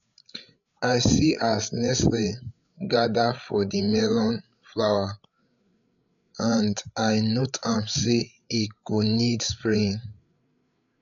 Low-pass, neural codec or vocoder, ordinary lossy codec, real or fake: 7.2 kHz; codec, 16 kHz, 8 kbps, FreqCodec, larger model; MP3, 96 kbps; fake